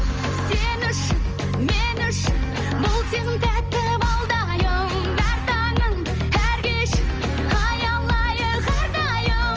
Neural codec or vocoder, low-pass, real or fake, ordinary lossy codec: none; 7.2 kHz; real; Opus, 24 kbps